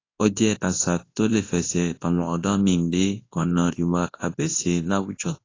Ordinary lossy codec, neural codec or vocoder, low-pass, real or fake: AAC, 32 kbps; codec, 24 kHz, 0.9 kbps, WavTokenizer, large speech release; 7.2 kHz; fake